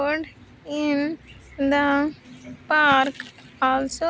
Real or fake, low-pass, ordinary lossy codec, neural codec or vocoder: real; none; none; none